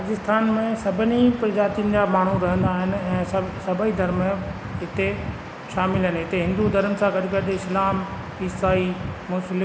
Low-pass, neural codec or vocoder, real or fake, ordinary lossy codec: none; none; real; none